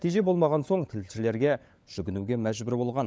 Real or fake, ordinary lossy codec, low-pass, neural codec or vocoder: fake; none; none; codec, 16 kHz, 16 kbps, FunCodec, trained on LibriTTS, 50 frames a second